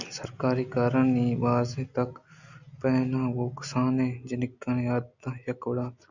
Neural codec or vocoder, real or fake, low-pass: none; real; 7.2 kHz